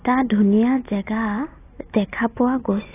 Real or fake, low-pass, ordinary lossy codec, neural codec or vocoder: real; 3.6 kHz; AAC, 16 kbps; none